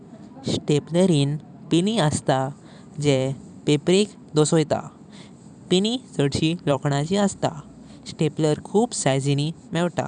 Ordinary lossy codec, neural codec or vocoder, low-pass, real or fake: none; vocoder, 44.1 kHz, 128 mel bands every 256 samples, BigVGAN v2; 10.8 kHz; fake